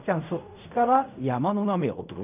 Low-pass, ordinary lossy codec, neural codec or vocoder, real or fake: 3.6 kHz; none; codec, 16 kHz in and 24 kHz out, 0.4 kbps, LongCat-Audio-Codec, fine tuned four codebook decoder; fake